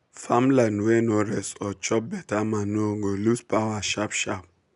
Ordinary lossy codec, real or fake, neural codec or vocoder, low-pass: none; real; none; 10.8 kHz